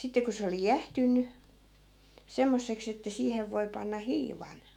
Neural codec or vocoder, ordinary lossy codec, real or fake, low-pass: autoencoder, 48 kHz, 128 numbers a frame, DAC-VAE, trained on Japanese speech; none; fake; 19.8 kHz